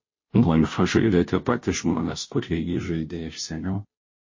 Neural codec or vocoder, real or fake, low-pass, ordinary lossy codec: codec, 16 kHz, 0.5 kbps, FunCodec, trained on Chinese and English, 25 frames a second; fake; 7.2 kHz; MP3, 32 kbps